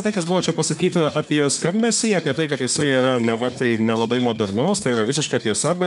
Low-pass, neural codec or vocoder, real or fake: 10.8 kHz; codec, 24 kHz, 1 kbps, SNAC; fake